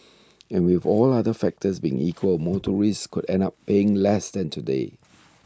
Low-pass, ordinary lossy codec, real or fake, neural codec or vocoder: none; none; real; none